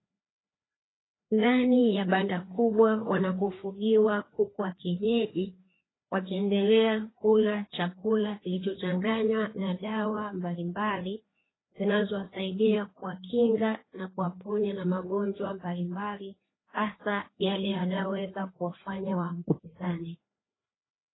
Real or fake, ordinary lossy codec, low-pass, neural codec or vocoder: fake; AAC, 16 kbps; 7.2 kHz; codec, 16 kHz, 2 kbps, FreqCodec, larger model